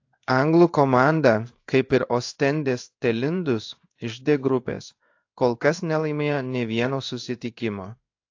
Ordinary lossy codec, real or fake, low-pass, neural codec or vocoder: AAC, 48 kbps; fake; 7.2 kHz; codec, 16 kHz in and 24 kHz out, 1 kbps, XY-Tokenizer